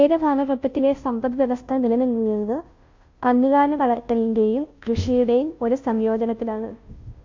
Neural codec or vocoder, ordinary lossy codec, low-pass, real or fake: codec, 16 kHz, 0.5 kbps, FunCodec, trained on Chinese and English, 25 frames a second; MP3, 48 kbps; 7.2 kHz; fake